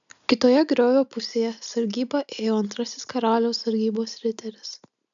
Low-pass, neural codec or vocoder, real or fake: 7.2 kHz; none; real